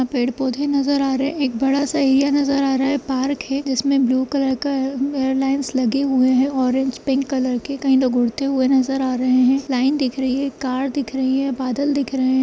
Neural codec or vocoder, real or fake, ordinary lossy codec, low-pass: none; real; none; none